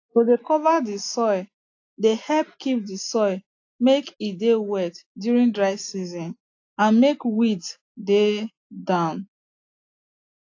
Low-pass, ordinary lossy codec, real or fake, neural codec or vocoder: 7.2 kHz; AAC, 48 kbps; real; none